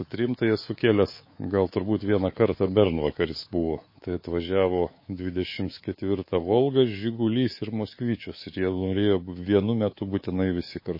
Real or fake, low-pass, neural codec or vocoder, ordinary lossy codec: fake; 5.4 kHz; codec, 24 kHz, 3.1 kbps, DualCodec; MP3, 24 kbps